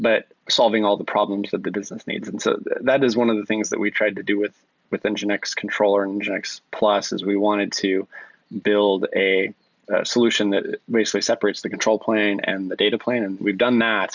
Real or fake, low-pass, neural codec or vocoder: real; 7.2 kHz; none